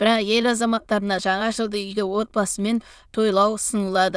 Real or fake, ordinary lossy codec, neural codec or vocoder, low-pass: fake; none; autoencoder, 22.05 kHz, a latent of 192 numbers a frame, VITS, trained on many speakers; none